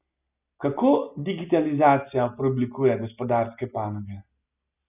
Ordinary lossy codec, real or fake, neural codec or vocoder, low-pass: none; real; none; 3.6 kHz